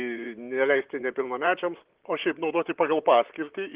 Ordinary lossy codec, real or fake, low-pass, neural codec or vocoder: Opus, 16 kbps; fake; 3.6 kHz; vocoder, 44.1 kHz, 128 mel bands, Pupu-Vocoder